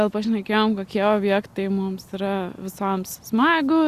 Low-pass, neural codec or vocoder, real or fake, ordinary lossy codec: 14.4 kHz; none; real; Opus, 64 kbps